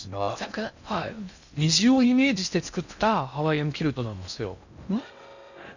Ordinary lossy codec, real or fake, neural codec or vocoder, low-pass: none; fake; codec, 16 kHz in and 24 kHz out, 0.6 kbps, FocalCodec, streaming, 4096 codes; 7.2 kHz